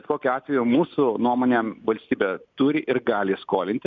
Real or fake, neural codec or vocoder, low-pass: real; none; 7.2 kHz